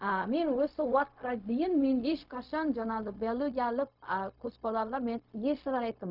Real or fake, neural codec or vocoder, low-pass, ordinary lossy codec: fake; codec, 16 kHz, 0.4 kbps, LongCat-Audio-Codec; 5.4 kHz; none